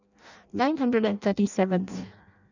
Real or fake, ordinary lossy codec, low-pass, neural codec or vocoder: fake; none; 7.2 kHz; codec, 16 kHz in and 24 kHz out, 0.6 kbps, FireRedTTS-2 codec